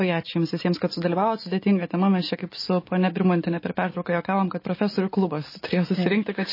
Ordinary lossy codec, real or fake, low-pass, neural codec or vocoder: MP3, 24 kbps; real; 5.4 kHz; none